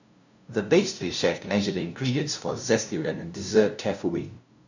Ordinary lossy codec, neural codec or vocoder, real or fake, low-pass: none; codec, 16 kHz, 0.5 kbps, FunCodec, trained on LibriTTS, 25 frames a second; fake; 7.2 kHz